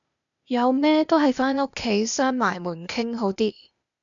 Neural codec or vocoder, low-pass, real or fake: codec, 16 kHz, 0.8 kbps, ZipCodec; 7.2 kHz; fake